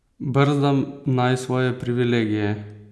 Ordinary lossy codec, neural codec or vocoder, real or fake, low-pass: none; none; real; none